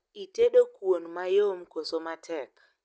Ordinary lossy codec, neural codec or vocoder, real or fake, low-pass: none; none; real; none